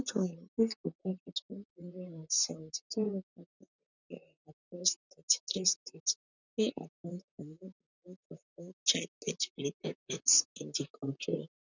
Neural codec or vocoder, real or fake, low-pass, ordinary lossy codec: vocoder, 44.1 kHz, 128 mel bands, Pupu-Vocoder; fake; 7.2 kHz; none